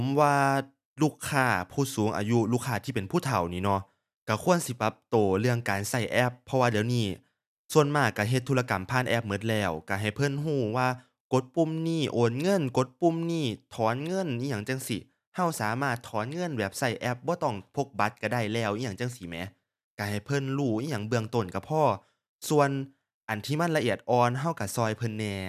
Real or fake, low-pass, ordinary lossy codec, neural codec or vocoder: real; 14.4 kHz; none; none